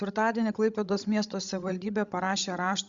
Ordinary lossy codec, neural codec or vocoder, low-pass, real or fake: Opus, 64 kbps; codec, 16 kHz, 16 kbps, FreqCodec, larger model; 7.2 kHz; fake